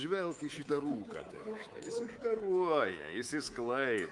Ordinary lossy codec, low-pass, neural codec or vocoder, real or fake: Opus, 32 kbps; 10.8 kHz; codec, 24 kHz, 3.1 kbps, DualCodec; fake